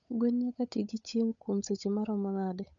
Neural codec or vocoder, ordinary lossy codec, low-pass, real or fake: codec, 16 kHz, 8 kbps, FunCodec, trained on Chinese and English, 25 frames a second; none; 7.2 kHz; fake